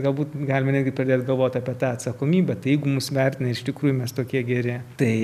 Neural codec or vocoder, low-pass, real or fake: none; 14.4 kHz; real